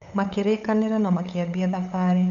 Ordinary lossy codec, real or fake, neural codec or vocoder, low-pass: none; fake; codec, 16 kHz, 8 kbps, FunCodec, trained on LibriTTS, 25 frames a second; 7.2 kHz